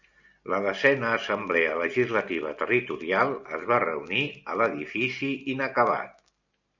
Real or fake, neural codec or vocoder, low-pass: real; none; 7.2 kHz